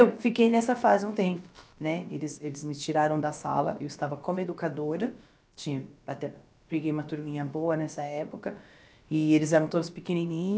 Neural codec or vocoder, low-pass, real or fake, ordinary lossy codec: codec, 16 kHz, about 1 kbps, DyCAST, with the encoder's durations; none; fake; none